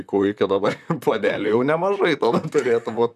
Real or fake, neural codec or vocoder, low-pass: fake; vocoder, 44.1 kHz, 128 mel bands, Pupu-Vocoder; 14.4 kHz